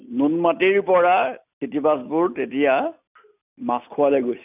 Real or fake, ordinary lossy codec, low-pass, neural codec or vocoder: real; none; 3.6 kHz; none